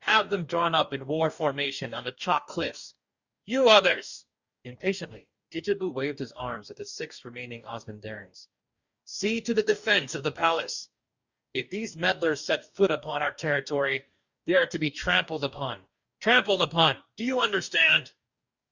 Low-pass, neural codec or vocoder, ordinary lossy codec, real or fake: 7.2 kHz; codec, 44.1 kHz, 2.6 kbps, DAC; Opus, 64 kbps; fake